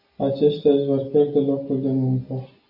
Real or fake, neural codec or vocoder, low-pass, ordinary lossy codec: real; none; 5.4 kHz; AAC, 48 kbps